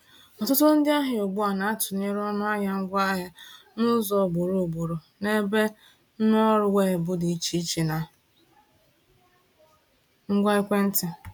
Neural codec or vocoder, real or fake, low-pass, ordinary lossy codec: none; real; 19.8 kHz; none